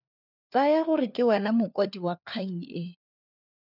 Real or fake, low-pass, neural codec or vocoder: fake; 5.4 kHz; codec, 16 kHz, 4 kbps, FunCodec, trained on LibriTTS, 50 frames a second